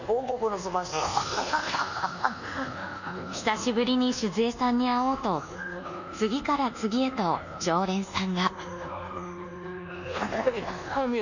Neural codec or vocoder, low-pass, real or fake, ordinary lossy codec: codec, 24 kHz, 1.2 kbps, DualCodec; 7.2 kHz; fake; none